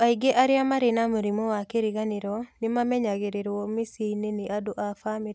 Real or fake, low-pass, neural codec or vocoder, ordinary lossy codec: real; none; none; none